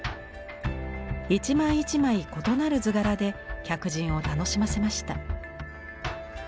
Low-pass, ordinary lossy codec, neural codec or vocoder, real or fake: none; none; none; real